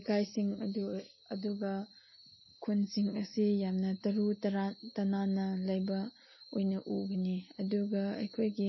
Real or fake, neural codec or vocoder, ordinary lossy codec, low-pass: real; none; MP3, 24 kbps; 7.2 kHz